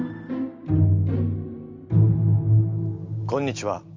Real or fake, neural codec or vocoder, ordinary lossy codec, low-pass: real; none; Opus, 32 kbps; 7.2 kHz